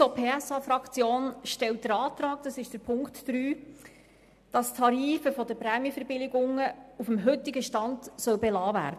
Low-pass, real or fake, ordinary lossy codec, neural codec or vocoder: 14.4 kHz; real; MP3, 96 kbps; none